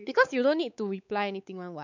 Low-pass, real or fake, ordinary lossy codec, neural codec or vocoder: 7.2 kHz; fake; none; codec, 16 kHz, 4 kbps, X-Codec, WavLM features, trained on Multilingual LibriSpeech